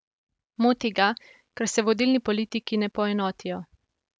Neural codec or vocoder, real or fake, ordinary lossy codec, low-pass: none; real; none; none